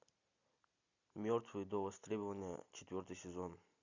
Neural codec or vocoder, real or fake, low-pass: none; real; 7.2 kHz